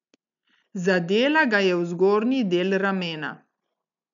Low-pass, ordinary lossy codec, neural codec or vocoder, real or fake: 7.2 kHz; none; none; real